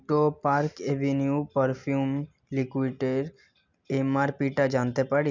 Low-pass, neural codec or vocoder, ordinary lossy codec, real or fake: 7.2 kHz; none; MP3, 64 kbps; real